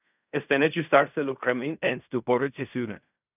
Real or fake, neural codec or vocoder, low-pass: fake; codec, 16 kHz in and 24 kHz out, 0.4 kbps, LongCat-Audio-Codec, fine tuned four codebook decoder; 3.6 kHz